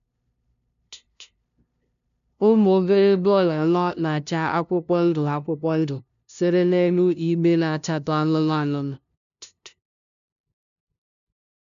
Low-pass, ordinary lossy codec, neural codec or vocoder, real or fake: 7.2 kHz; none; codec, 16 kHz, 0.5 kbps, FunCodec, trained on LibriTTS, 25 frames a second; fake